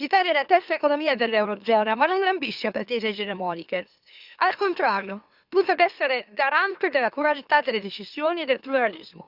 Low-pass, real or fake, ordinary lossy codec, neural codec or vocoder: 5.4 kHz; fake; Opus, 64 kbps; autoencoder, 44.1 kHz, a latent of 192 numbers a frame, MeloTTS